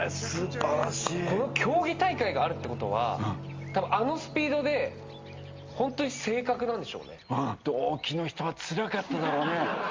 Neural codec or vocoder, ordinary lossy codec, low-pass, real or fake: none; Opus, 32 kbps; 7.2 kHz; real